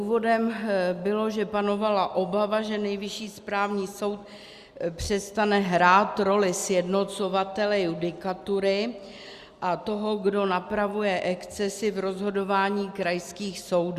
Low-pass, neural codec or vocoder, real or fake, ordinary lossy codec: 14.4 kHz; none; real; Opus, 64 kbps